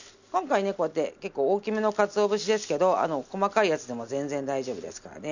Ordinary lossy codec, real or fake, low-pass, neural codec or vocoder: none; real; 7.2 kHz; none